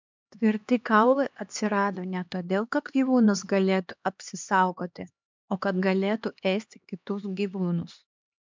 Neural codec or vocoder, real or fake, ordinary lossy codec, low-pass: codec, 16 kHz, 2 kbps, X-Codec, HuBERT features, trained on LibriSpeech; fake; MP3, 64 kbps; 7.2 kHz